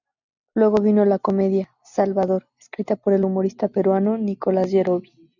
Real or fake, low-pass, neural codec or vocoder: real; 7.2 kHz; none